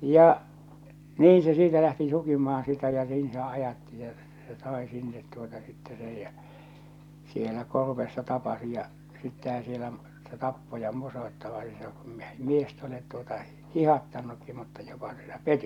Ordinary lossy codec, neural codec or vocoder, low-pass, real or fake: none; none; 19.8 kHz; real